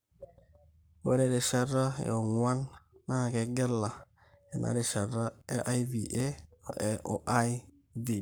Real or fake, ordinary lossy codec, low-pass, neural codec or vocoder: fake; none; none; codec, 44.1 kHz, 7.8 kbps, Pupu-Codec